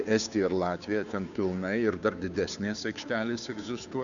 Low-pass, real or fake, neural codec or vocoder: 7.2 kHz; fake; codec, 16 kHz, 2 kbps, FunCodec, trained on Chinese and English, 25 frames a second